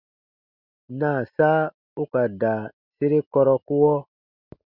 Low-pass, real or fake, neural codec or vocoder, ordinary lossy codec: 5.4 kHz; real; none; Opus, 64 kbps